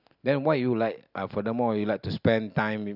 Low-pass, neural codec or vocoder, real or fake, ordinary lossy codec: 5.4 kHz; none; real; none